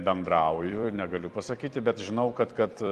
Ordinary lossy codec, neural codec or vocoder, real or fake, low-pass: Opus, 24 kbps; vocoder, 44.1 kHz, 128 mel bands every 256 samples, BigVGAN v2; fake; 14.4 kHz